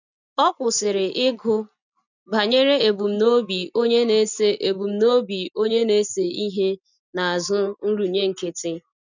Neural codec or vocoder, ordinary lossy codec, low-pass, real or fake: none; none; 7.2 kHz; real